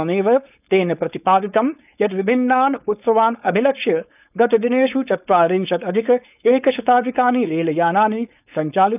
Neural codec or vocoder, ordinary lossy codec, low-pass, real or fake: codec, 16 kHz, 4.8 kbps, FACodec; none; 3.6 kHz; fake